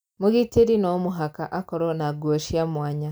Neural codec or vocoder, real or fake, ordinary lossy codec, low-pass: none; real; none; none